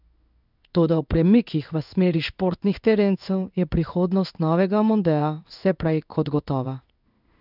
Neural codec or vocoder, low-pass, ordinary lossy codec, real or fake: codec, 16 kHz in and 24 kHz out, 1 kbps, XY-Tokenizer; 5.4 kHz; none; fake